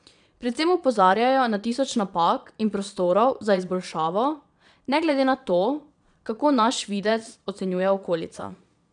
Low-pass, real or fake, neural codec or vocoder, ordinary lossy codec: 9.9 kHz; fake; vocoder, 22.05 kHz, 80 mel bands, Vocos; none